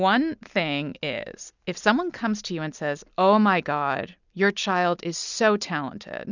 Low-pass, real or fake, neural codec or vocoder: 7.2 kHz; real; none